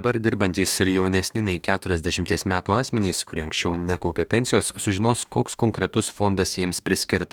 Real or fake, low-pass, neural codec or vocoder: fake; 19.8 kHz; codec, 44.1 kHz, 2.6 kbps, DAC